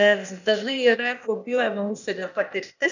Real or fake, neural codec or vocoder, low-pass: fake; codec, 16 kHz, 0.8 kbps, ZipCodec; 7.2 kHz